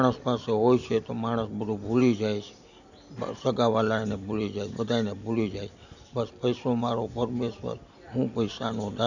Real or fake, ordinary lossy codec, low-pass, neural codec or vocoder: real; none; 7.2 kHz; none